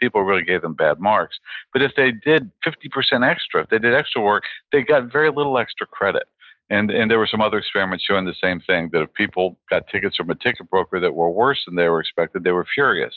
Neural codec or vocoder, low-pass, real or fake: none; 7.2 kHz; real